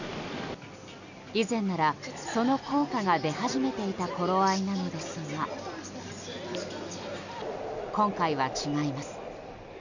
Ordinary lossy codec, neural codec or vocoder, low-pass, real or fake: none; none; 7.2 kHz; real